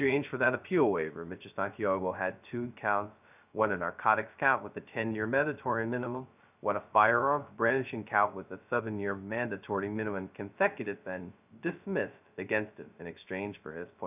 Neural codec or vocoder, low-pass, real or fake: codec, 16 kHz, 0.2 kbps, FocalCodec; 3.6 kHz; fake